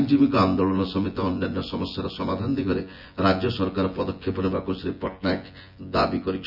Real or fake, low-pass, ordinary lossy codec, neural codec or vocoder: fake; 5.4 kHz; none; vocoder, 24 kHz, 100 mel bands, Vocos